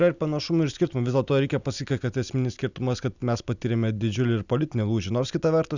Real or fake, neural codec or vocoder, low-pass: real; none; 7.2 kHz